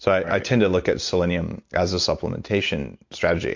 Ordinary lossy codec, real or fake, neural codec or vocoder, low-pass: MP3, 48 kbps; real; none; 7.2 kHz